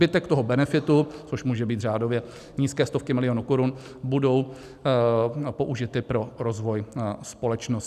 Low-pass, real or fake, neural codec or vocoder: 14.4 kHz; real; none